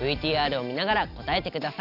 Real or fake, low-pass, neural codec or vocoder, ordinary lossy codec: real; 5.4 kHz; none; none